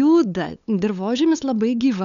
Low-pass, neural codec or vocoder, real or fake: 7.2 kHz; codec, 16 kHz, 8 kbps, FunCodec, trained on LibriTTS, 25 frames a second; fake